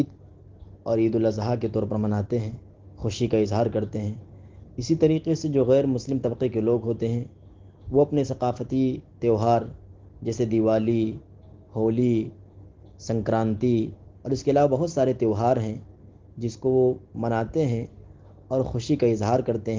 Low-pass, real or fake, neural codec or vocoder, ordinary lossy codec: 7.2 kHz; real; none; Opus, 16 kbps